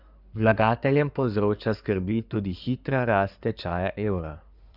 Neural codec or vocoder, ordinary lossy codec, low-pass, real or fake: codec, 16 kHz in and 24 kHz out, 2.2 kbps, FireRedTTS-2 codec; none; 5.4 kHz; fake